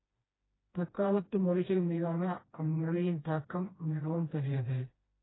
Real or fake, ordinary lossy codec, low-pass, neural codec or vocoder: fake; AAC, 16 kbps; 7.2 kHz; codec, 16 kHz, 1 kbps, FreqCodec, smaller model